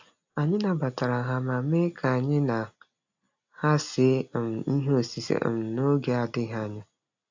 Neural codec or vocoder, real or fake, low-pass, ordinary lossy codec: none; real; 7.2 kHz; AAC, 48 kbps